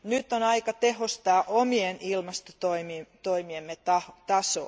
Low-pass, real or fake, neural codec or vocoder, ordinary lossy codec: none; real; none; none